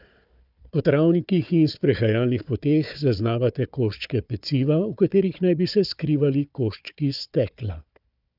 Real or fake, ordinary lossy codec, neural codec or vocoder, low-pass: fake; none; codec, 16 kHz, 4 kbps, FunCodec, trained on Chinese and English, 50 frames a second; 5.4 kHz